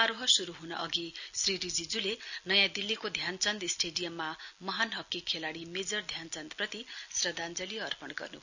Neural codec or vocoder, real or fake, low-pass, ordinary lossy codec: none; real; 7.2 kHz; none